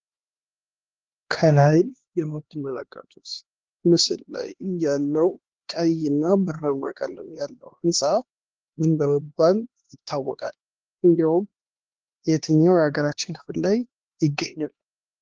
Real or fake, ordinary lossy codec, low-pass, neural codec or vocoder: fake; Opus, 16 kbps; 7.2 kHz; codec, 16 kHz, 2 kbps, X-Codec, HuBERT features, trained on LibriSpeech